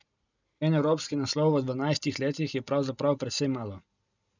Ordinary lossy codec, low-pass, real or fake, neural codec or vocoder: none; 7.2 kHz; real; none